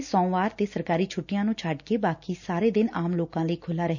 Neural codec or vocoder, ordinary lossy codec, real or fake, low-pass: none; none; real; 7.2 kHz